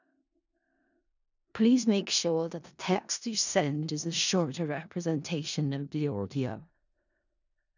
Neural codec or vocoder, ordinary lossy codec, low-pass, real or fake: codec, 16 kHz in and 24 kHz out, 0.4 kbps, LongCat-Audio-Codec, four codebook decoder; none; 7.2 kHz; fake